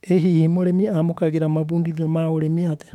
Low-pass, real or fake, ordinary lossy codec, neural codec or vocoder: 19.8 kHz; fake; MP3, 96 kbps; autoencoder, 48 kHz, 32 numbers a frame, DAC-VAE, trained on Japanese speech